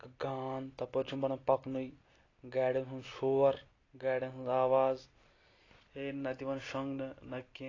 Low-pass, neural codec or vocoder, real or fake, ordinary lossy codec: 7.2 kHz; none; real; AAC, 32 kbps